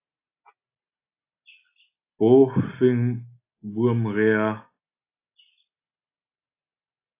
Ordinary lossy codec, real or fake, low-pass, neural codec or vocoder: AAC, 24 kbps; real; 3.6 kHz; none